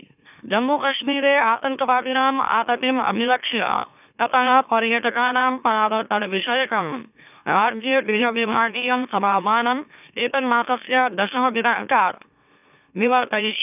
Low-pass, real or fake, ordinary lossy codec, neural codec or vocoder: 3.6 kHz; fake; none; autoencoder, 44.1 kHz, a latent of 192 numbers a frame, MeloTTS